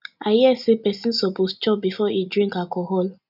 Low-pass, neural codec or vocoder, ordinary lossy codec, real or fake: 5.4 kHz; none; none; real